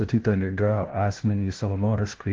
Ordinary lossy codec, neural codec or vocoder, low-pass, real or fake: Opus, 16 kbps; codec, 16 kHz, 0.5 kbps, FunCodec, trained on LibriTTS, 25 frames a second; 7.2 kHz; fake